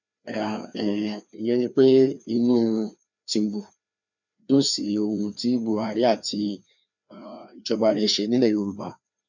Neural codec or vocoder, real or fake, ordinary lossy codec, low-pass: codec, 16 kHz, 2 kbps, FreqCodec, larger model; fake; none; 7.2 kHz